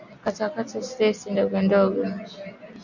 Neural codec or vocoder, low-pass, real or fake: none; 7.2 kHz; real